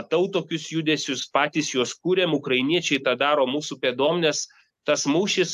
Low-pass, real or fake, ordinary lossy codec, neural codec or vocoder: 14.4 kHz; real; AAC, 96 kbps; none